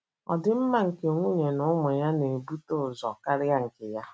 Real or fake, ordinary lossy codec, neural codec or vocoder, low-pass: real; none; none; none